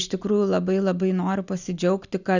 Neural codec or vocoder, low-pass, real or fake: none; 7.2 kHz; real